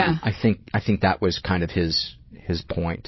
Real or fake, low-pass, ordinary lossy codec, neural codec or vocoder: fake; 7.2 kHz; MP3, 24 kbps; vocoder, 22.05 kHz, 80 mel bands, Vocos